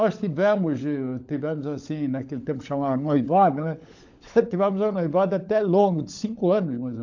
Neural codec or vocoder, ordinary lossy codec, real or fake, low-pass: codec, 16 kHz, 16 kbps, FunCodec, trained on LibriTTS, 50 frames a second; AAC, 48 kbps; fake; 7.2 kHz